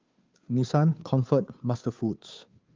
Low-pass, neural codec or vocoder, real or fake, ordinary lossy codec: 7.2 kHz; codec, 16 kHz, 2 kbps, FunCodec, trained on Chinese and English, 25 frames a second; fake; Opus, 32 kbps